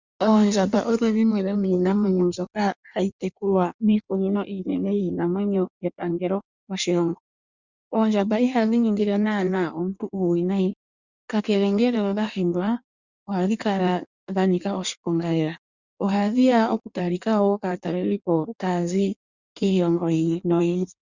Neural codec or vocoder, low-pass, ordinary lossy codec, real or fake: codec, 16 kHz in and 24 kHz out, 1.1 kbps, FireRedTTS-2 codec; 7.2 kHz; Opus, 64 kbps; fake